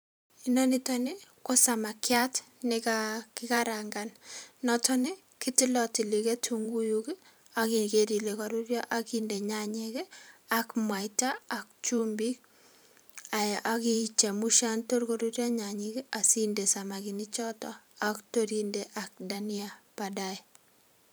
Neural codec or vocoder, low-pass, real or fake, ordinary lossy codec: vocoder, 44.1 kHz, 128 mel bands every 512 samples, BigVGAN v2; none; fake; none